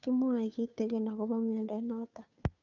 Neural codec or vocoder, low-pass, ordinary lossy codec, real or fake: codec, 16 kHz, 2 kbps, FunCodec, trained on Chinese and English, 25 frames a second; 7.2 kHz; none; fake